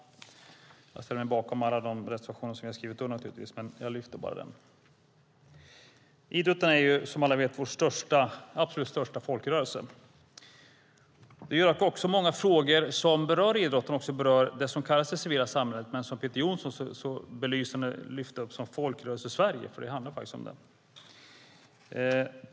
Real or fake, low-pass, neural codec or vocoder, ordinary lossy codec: real; none; none; none